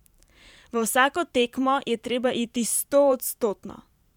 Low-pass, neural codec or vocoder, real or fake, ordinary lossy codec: 19.8 kHz; vocoder, 44.1 kHz, 128 mel bands every 512 samples, BigVGAN v2; fake; none